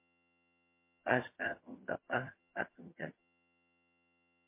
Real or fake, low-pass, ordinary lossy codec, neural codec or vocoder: fake; 3.6 kHz; MP3, 24 kbps; vocoder, 22.05 kHz, 80 mel bands, HiFi-GAN